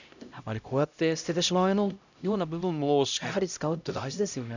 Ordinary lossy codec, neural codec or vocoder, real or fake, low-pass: none; codec, 16 kHz, 0.5 kbps, X-Codec, HuBERT features, trained on LibriSpeech; fake; 7.2 kHz